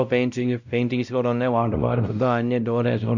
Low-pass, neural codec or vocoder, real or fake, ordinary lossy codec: 7.2 kHz; codec, 16 kHz, 0.5 kbps, X-Codec, WavLM features, trained on Multilingual LibriSpeech; fake; none